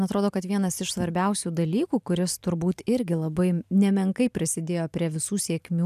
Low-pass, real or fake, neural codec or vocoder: 14.4 kHz; fake; vocoder, 44.1 kHz, 128 mel bands every 512 samples, BigVGAN v2